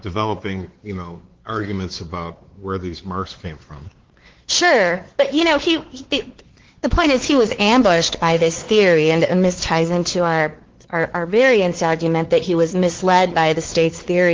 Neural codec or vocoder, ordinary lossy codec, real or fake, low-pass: codec, 16 kHz, 4 kbps, X-Codec, WavLM features, trained on Multilingual LibriSpeech; Opus, 16 kbps; fake; 7.2 kHz